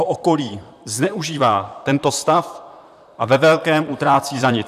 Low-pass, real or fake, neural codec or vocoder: 14.4 kHz; fake; vocoder, 44.1 kHz, 128 mel bands, Pupu-Vocoder